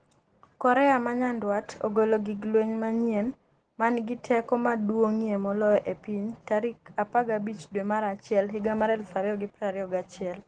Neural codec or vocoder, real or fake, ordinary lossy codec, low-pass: none; real; Opus, 16 kbps; 9.9 kHz